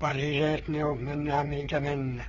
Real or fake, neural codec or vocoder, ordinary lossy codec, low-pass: fake; codec, 16 kHz, 4 kbps, FreqCodec, larger model; AAC, 24 kbps; 7.2 kHz